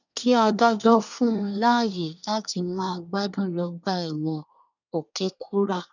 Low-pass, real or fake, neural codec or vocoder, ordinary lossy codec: 7.2 kHz; fake; codec, 24 kHz, 1 kbps, SNAC; none